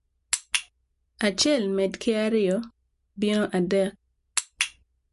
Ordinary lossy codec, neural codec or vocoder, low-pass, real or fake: MP3, 48 kbps; none; 14.4 kHz; real